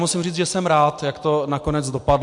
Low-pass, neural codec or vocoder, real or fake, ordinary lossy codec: 10.8 kHz; none; real; MP3, 64 kbps